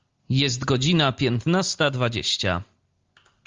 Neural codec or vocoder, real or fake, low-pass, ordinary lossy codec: none; real; 7.2 kHz; Opus, 32 kbps